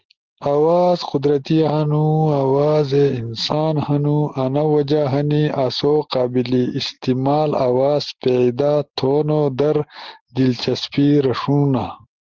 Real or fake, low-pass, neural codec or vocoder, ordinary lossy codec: real; 7.2 kHz; none; Opus, 16 kbps